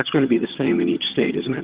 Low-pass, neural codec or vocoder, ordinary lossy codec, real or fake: 3.6 kHz; vocoder, 22.05 kHz, 80 mel bands, HiFi-GAN; Opus, 16 kbps; fake